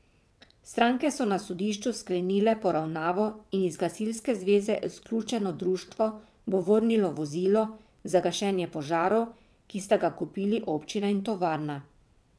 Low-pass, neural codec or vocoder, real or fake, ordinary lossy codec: none; vocoder, 22.05 kHz, 80 mel bands, WaveNeXt; fake; none